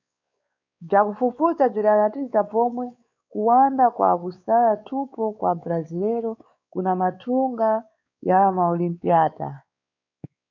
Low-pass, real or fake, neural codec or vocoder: 7.2 kHz; fake; codec, 16 kHz, 4 kbps, X-Codec, WavLM features, trained on Multilingual LibriSpeech